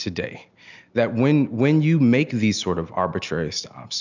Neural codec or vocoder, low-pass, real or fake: none; 7.2 kHz; real